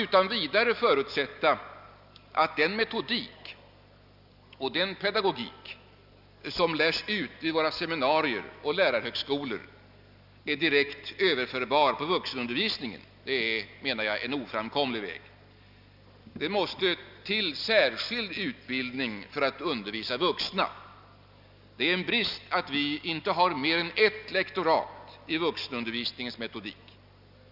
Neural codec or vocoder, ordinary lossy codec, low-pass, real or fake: none; none; 5.4 kHz; real